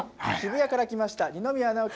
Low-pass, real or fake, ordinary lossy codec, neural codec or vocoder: none; real; none; none